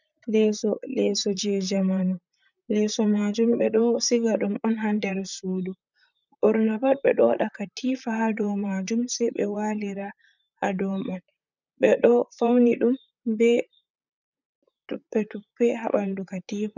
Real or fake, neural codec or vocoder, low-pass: fake; vocoder, 44.1 kHz, 128 mel bands, Pupu-Vocoder; 7.2 kHz